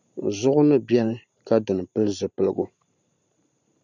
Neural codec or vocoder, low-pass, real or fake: vocoder, 44.1 kHz, 128 mel bands every 512 samples, BigVGAN v2; 7.2 kHz; fake